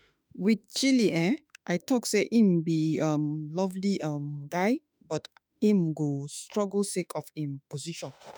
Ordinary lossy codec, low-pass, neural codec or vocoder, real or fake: none; none; autoencoder, 48 kHz, 32 numbers a frame, DAC-VAE, trained on Japanese speech; fake